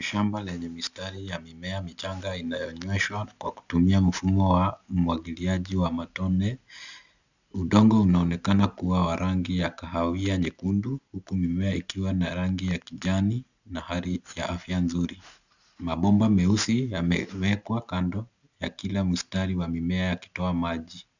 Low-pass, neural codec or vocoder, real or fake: 7.2 kHz; none; real